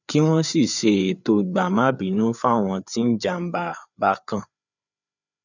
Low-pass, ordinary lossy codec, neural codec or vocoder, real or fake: 7.2 kHz; none; codec, 16 kHz, 4 kbps, FreqCodec, larger model; fake